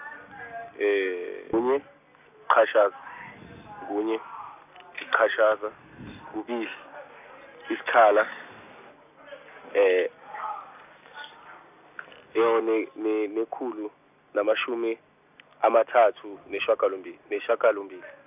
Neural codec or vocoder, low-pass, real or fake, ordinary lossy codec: none; 3.6 kHz; real; none